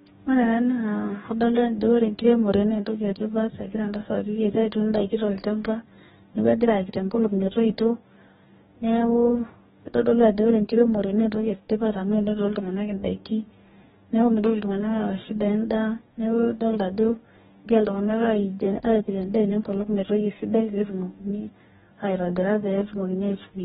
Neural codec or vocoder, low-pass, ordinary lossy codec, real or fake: codec, 44.1 kHz, 2.6 kbps, DAC; 19.8 kHz; AAC, 16 kbps; fake